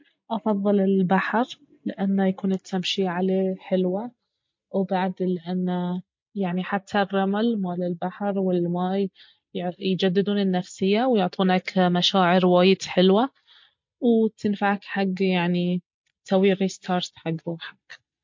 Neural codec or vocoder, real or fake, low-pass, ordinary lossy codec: none; real; 7.2 kHz; MP3, 48 kbps